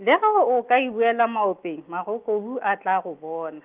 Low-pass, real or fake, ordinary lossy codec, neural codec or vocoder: 3.6 kHz; real; Opus, 32 kbps; none